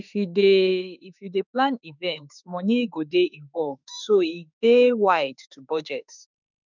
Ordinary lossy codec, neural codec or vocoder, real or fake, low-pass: none; autoencoder, 48 kHz, 32 numbers a frame, DAC-VAE, trained on Japanese speech; fake; 7.2 kHz